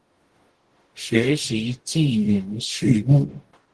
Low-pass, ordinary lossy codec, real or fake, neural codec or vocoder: 10.8 kHz; Opus, 16 kbps; fake; codec, 44.1 kHz, 0.9 kbps, DAC